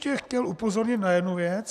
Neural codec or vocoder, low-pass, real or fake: none; 14.4 kHz; real